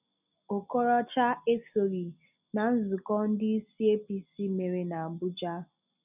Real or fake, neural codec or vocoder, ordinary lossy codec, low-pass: real; none; none; 3.6 kHz